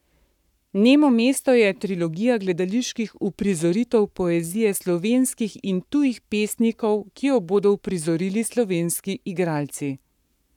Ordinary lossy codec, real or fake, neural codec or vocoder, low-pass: none; fake; codec, 44.1 kHz, 7.8 kbps, Pupu-Codec; 19.8 kHz